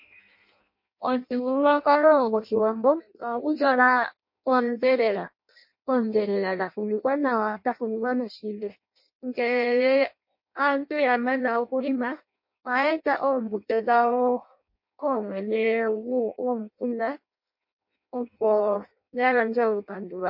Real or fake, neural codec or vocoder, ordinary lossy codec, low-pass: fake; codec, 16 kHz in and 24 kHz out, 0.6 kbps, FireRedTTS-2 codec; MP3, 32 kbps; 5.4 kHz